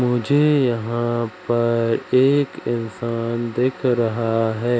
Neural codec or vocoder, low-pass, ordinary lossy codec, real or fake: none; none; none; real